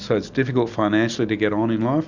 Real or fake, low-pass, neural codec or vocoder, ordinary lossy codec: real; 7.2 kHz; none; Opus, 64 kbps